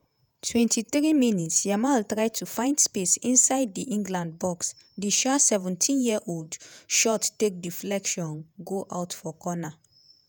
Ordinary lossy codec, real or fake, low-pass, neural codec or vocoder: none; fake; none; vocoder, 48 kHz, 128 mel bands, Vocos